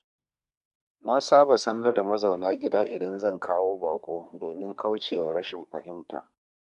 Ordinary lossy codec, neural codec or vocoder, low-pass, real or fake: none; codec, 24 kHz, 1 kbps, SNAC; 10.8 kHz; fake